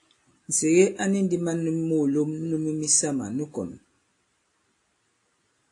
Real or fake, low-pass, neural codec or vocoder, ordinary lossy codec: real; 10.8 kHz; none; AAC, 48 kbps